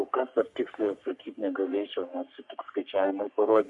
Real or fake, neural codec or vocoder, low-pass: fake; codec, 44.1 kHz, 3.4 kbps, Pupu-Codec; 10.8 kHz